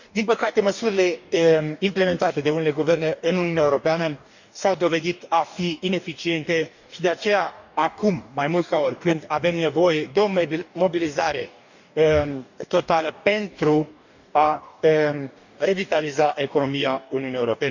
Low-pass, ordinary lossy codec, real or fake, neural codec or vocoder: 7.2 kHz; none; fake; codec, 44.1 kHz, 2.6 kbps, DAC